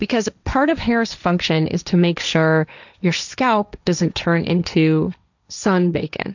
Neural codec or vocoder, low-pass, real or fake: codec, 16 kHz, 1.1 kbps, Voila-Tokenizer; 7.2 kHz; fake